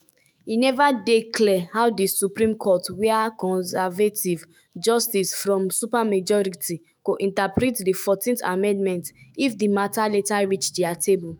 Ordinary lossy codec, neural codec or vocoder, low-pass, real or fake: none; autoencoder, 48 kHz, 128 numbers a frame, DAC-VAE, trained on Japanese speech; none; fake